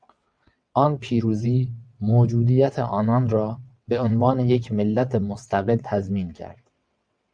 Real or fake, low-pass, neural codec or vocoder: fake; 9.9 kHz; vocoder, 22.05 kHz, 80 mel bands, WaveNeXt